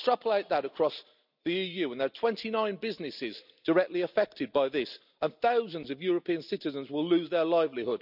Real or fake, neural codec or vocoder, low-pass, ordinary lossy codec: real; none; 5.4 kHz; none